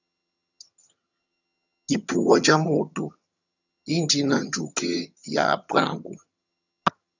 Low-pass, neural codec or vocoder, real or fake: 7.2 kHz; vocoder, 22.05 kHz, 80 mel bands, HiFi-GAN; fake